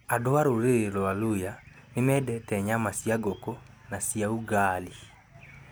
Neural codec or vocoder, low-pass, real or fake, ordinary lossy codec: none; none; real; none